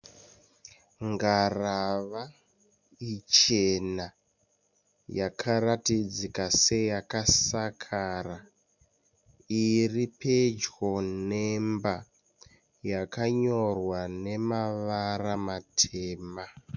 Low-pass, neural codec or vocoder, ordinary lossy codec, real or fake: 7.2 kHz; none; MP3, 64 kbps; real